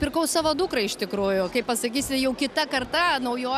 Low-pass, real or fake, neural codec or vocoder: 14.4 kHz; real; none